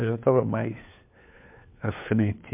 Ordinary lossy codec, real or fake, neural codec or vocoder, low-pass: MP3, 32 kbps; fake; codec, 16 kHz, 4 kbps, FunCodec, trained on LibriTTS, 50 frames a second; 3.6 kHz